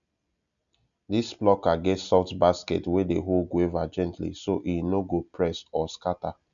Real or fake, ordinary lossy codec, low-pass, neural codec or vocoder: real; none; 7.2 kHz; none